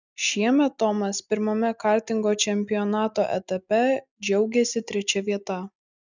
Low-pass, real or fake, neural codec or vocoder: 7.2 kHz; real; none